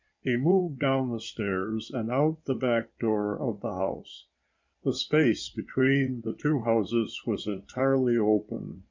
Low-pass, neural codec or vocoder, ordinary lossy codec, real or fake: 7.2 kHz; codec, 16 kHz in and 24 kHz out, 2.2 kbps, FireRedTTS-2 codec; MP3, 64 kbps; fake